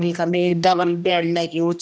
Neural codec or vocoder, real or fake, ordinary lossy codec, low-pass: codec, 16 kHz, 1 kbps, X-Codec, HuBERT features, trained on general audio; fake; none; none